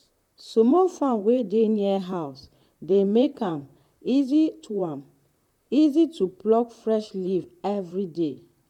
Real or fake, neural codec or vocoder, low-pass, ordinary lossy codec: fake; vocoder, 44.1 kHz, 128 mel bands, Pupu-Vocoder; 19.8 kHz; none